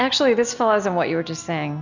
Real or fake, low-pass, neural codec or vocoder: real; 7.2 kHz; none